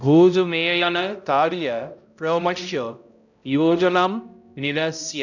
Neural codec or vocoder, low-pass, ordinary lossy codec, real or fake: codec, 16 kHz, 0.5 kbps, X-Codec, HuBERT features, trained on balanced general audio; 7.2 kHz; none; fake